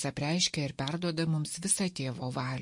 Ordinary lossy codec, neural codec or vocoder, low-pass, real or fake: MP3, 48 kbps; none; 10.8 kHz; real